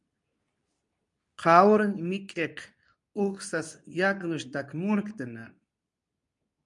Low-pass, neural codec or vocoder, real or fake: 10.8 kHz; codec, 24 kHz, 0.9 kbps, WavTokenizer, medium speech release version 2; fake